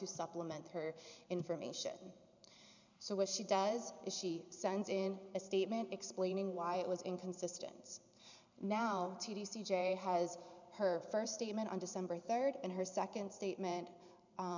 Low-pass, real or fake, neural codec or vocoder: 7.2 kHz; fake; vocoder, 44.1 kHz, 128 mel bands every 512 samples, BigVGAN v2